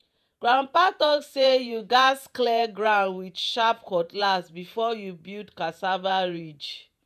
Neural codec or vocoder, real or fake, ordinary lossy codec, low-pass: vocoder, 48 kHz, 128 mel bands, Vocos; fake; none; 14.4 kHz